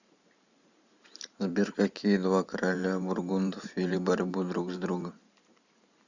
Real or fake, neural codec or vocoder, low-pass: fake; vocoder, 44.1 kHz, 128 mel bands every 512 samples, BigVGAN v2; 7.2 kHz